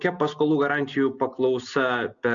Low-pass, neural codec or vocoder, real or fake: 7.2 kHz; none; real